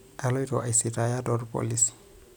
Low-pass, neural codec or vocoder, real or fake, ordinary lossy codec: none; none; real; none